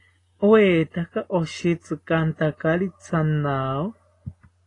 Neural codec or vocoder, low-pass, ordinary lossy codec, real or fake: none; 10.8 kHz; AAC, 32 kbps; real